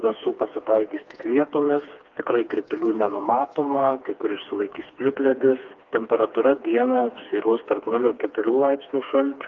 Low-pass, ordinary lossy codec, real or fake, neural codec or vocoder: 7.2 kHz; Opus, 32 kbps; fake; codec, 16 kHz, 2 kbps, FreqCodec, smaller model